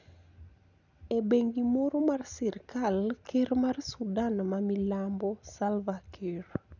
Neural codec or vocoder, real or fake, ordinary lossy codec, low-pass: none; real; none; 7.2 kHz